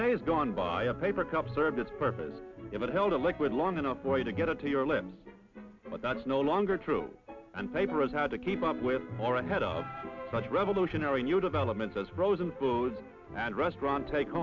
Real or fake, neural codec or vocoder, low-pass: real; none; 7.2 kHz